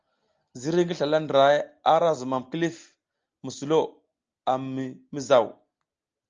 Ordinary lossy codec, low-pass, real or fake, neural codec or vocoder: Opus, 24 kbps; 7.2 kHz; real; none